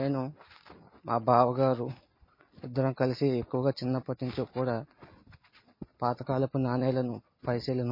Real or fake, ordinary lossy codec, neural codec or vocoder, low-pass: real; MP3, 24 kbps; none; 5.4 kHz